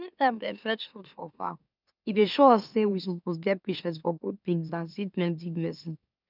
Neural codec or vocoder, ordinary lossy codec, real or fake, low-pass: autoencoder, 44.1 kHz, a latent of 192 numbers a frame, MeloTTS; none; fake; 5.4 kHz